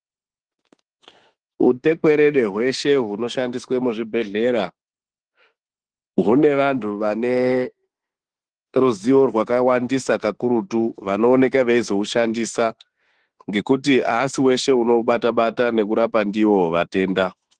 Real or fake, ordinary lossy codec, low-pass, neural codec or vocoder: fake; Opus, 16 kbps; 9.9 kHz; autoencoder, 48 kHz, 32 numbers a frame, DAC-VAE, trained on Japanese speech